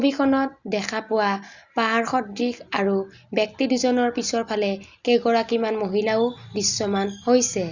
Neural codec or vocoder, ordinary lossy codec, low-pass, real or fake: none; Opus, 64 kbps; 7.2 kHz; real